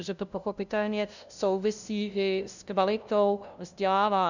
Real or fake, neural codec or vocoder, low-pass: fake; codec, 16 kHz, 0.5 kbps, FunCodec, trained on LibriTTS, 25 frames a second; 7.2 kHz